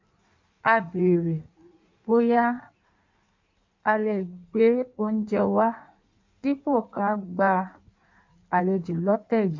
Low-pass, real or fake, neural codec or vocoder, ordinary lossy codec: 7.2 kHz; fake; codec, 16 kHz in and 24 kHz out, 1.1 kbps, FireRedTTS-2 codec; MP3, 48 kbps